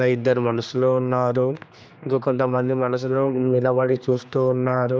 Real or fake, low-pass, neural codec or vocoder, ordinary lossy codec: fake; none; codec, 16 kHz, 2 kbps, X-Codec, HuBERT features, trained on general audio; none